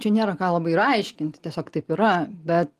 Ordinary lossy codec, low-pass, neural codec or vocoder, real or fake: Opus, 24 kbps; 14.4 kHz; none; real